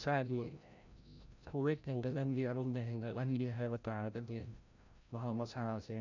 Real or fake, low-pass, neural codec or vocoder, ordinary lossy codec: fake; 7.2 kHz; codec, 16 kHz, 0.5 kbps, FreqCodec, larger model; none